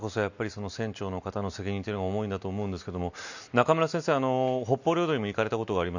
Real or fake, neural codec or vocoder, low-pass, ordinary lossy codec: real; none; 7.2 kHz; none